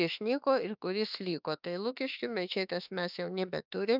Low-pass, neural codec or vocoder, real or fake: 5.4 kHz; autoencoder, 48 kHz, 32 numbers a frame, DAC-VAE, trained on Japanese speech; fake